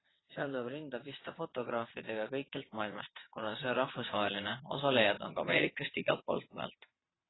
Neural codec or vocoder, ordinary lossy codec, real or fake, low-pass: vocoder, 22.05 kHz, 80 mel bands, WaveNeXt; AAC, 16 kbps; fake; 7.2 kHz